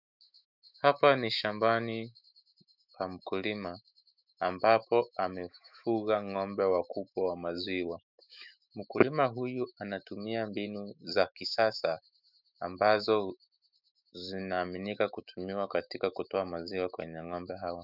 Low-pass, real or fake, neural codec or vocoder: 5.4 kHz; fake; autoencoder, 48 kHz, 128 numbers a frame, DAC-VAE, trained on Japanese speech